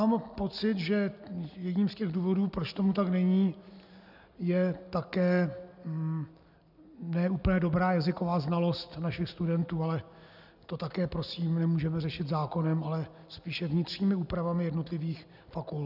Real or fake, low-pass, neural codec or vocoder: real; 5.4 kHz; none